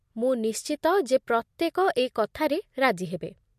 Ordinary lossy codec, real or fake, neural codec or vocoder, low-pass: MP3, 64 kbps; real; none; 14.4 kHz